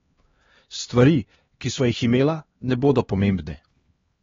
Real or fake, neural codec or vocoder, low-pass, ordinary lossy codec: fake; codec, 16 kHz, 2 kbps, X-Codec, HuBERT features, trained on LibriSpeech; 7.2 kHz; AAC, 24 kbps